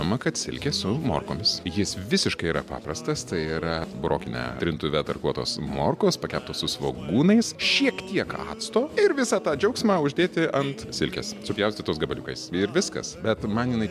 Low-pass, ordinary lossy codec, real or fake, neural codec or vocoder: 14.4 kHz; MP3, 96 kbps; real; none